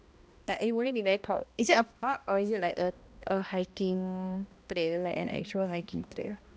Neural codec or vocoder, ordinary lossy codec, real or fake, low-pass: codec, 16 kHz, 1 kbps, X-Codec, HuBERT features, trained on balanced general audio; none; fake; none